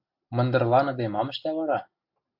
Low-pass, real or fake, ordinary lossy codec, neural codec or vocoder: 5.4 kHz; real; MP3, 48 kbps; none